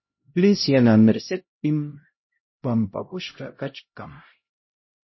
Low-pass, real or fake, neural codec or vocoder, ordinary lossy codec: 7.2 kHz; fake; codec, 16 kHz, 0.5 kbps, X-Codec, HuBERT features, trained on LibriSpeech; MP3, 24 kbps